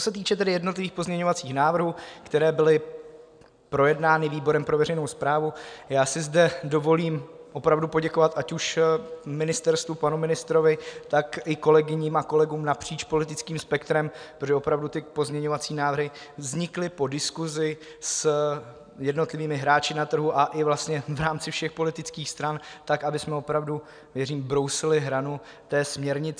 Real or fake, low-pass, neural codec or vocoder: real; 9.9 kHz; none